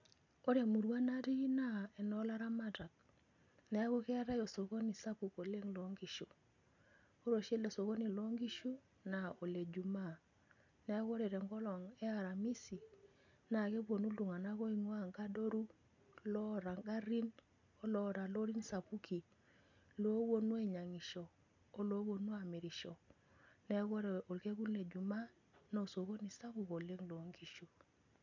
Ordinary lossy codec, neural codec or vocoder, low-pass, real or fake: none; none; 7.2 kHz; real